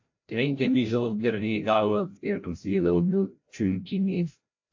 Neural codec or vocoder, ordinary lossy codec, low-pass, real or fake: codec, 16 kHz, 0.5 kbps, FreqCodec, larger model; AAC, 48 kbps; 7.2 kHz; fake